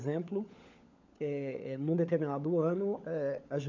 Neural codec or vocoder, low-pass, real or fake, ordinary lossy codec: codec, 16 kHz, 16 kbps, FunCodec, trained on LibriTTS, 50 frames a second; 7.2 kHz; fake; MP3, 64 kbps